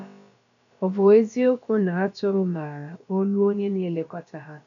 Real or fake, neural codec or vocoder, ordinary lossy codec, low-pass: fake; codec, 16 kHz, about 1 kbps, DyCAST, with the encoder's durations; none; 7.2 kHz